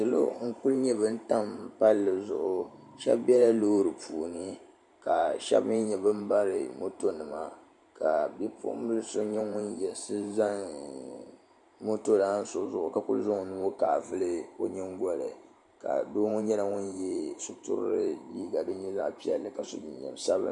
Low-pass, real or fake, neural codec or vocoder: 10.8 kHz; fake; vocoder, 44.1 kHz, 128 mel bands every 512 samples, BigVGAN v2